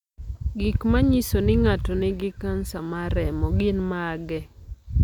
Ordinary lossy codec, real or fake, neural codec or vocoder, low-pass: none; real; none; 19.8 kHz